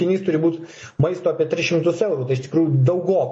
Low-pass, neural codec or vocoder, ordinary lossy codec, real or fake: 10.8 kHz; none; MP3, 32 kbps; real